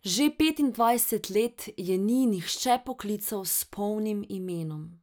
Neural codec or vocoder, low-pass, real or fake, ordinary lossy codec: none; none; real; none